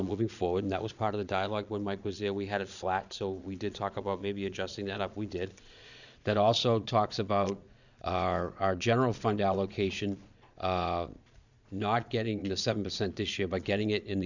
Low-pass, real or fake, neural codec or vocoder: 7.2 kHz; fake; vocoder, 22.05 kHz, 80 mel bands, Vocos